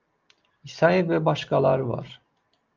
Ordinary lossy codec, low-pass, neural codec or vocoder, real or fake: Opus, 24 kbps; 7.2 kHz; none; real